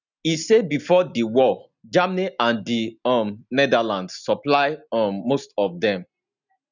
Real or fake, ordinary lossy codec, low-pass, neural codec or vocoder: real; none; 7.2 kHz; none